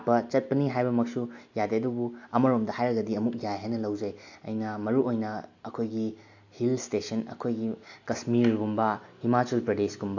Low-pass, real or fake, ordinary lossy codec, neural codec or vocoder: 7.2 kHz; real; AAC, 48 kbps; none